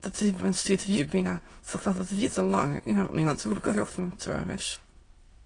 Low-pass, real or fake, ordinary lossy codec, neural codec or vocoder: 9.9 kHz; fake; AAC, 32 kbps; autoencoder, 22.05 kHz, a latent of 192 numbers a frame, VITS, trained on many speakers